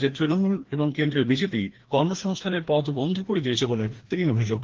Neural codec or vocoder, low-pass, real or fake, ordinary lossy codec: codec, 16 kHz, 1 kbps, FreqCodec, larger model; 7.2 kHz; fake; Opus, 16 kbps